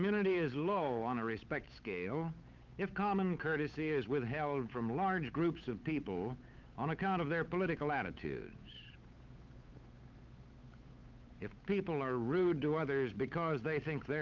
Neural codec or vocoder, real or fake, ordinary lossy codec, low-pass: codec, 16 kHz, 8 kbps, FunCodec, trained on Chinese and English, 25 frames a second; fake; Opus, 24 kbps; 7.2 kHz